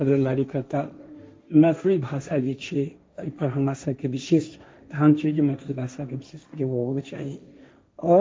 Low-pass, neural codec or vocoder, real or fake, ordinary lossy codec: none; codec, 16 kHz, 1.1 kbps, Voila-Tokenizer; fake; none